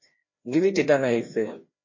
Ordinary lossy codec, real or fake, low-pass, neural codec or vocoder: MP3, 32 kbps; fake; 7.2 kHz; codec, 16 kHz, 1 kbps, FreqCodec, larger model